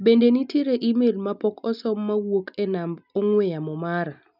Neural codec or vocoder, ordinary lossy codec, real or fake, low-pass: none; none; real; 5.4 kHz